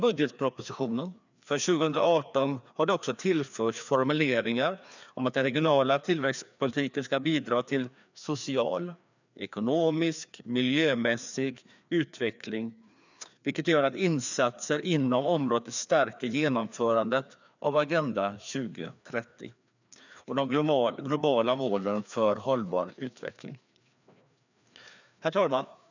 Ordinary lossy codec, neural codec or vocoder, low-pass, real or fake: none; codec, 16 kHz, 2 kbps, FreqCodec, larger model; 7.2 kHz; fake